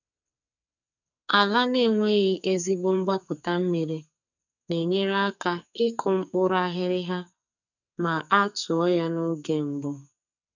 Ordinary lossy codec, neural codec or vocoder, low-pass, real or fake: none; codec, 44.1 kHz, 2.6 kbps, SNAC; 7.2 kHz; fake